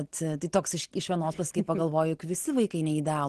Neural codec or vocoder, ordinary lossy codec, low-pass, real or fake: none; Opus, 16 kbps; 10.8 kHz; real